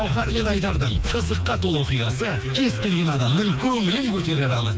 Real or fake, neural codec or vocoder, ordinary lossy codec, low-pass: fake; codec, 16 kHz, 2 kbps, FreqCodec, smaller model; none; none